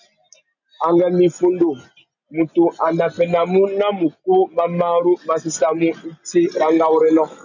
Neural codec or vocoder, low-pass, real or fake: none; 7.2 kHz; real